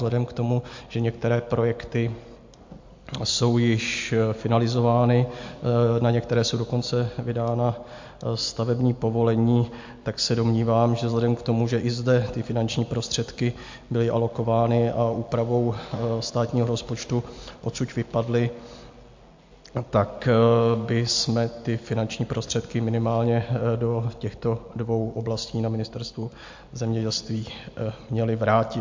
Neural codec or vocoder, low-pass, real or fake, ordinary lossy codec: none; 7.2 kHz; real; MP3, 48 kbps